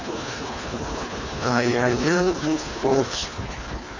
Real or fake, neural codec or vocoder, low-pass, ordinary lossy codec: fake; codec, 24 kHz, 1.5 kbps, HILCodec; 7.2 kHz; MP3, 32 kbps